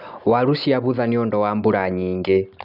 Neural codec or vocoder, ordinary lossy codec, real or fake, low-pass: none; none; real; 5.4 kHz